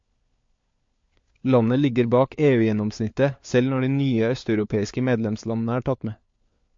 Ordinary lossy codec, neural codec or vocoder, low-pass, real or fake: MP3, 48 kbps; codec, 16 kHz, 4 kbps, FunCodec, trained on LibriTTS, 50 frames a second; 7.2 kHz; fake